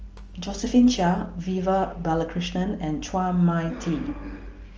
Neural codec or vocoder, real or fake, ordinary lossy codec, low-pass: none; real; Opus, 24 kbps; 7.2 kHz